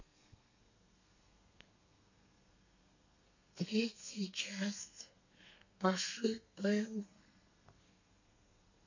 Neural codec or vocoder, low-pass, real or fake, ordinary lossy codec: codec, 32 kHz, 1.9 kbps, SNAC; 7.2 kHz; fake; none